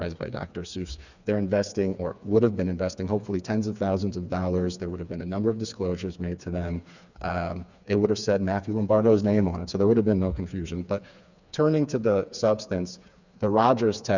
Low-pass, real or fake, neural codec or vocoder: 7.2 kHz; fake; codec, 16 kHz, 4 kbps, FreqCodec, smaller model